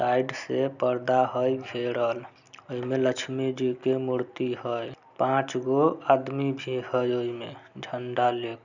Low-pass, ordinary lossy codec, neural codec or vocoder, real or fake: 7.2 kHz; none; none; real